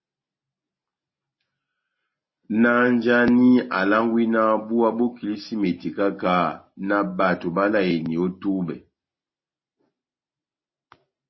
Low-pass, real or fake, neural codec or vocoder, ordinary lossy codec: 7.2 kHz; real; none; MP3, 24 kbps